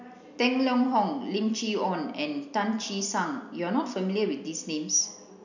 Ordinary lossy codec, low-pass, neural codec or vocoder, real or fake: none; 7.2 kHz; none; real